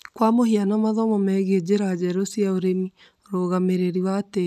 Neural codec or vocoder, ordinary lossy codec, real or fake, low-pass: none; none; real; 14.4 kHz